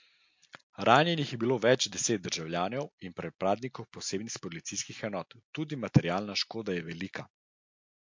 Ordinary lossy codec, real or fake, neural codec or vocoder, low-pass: MP3, 48 kbps; real; none; 7.2 kHz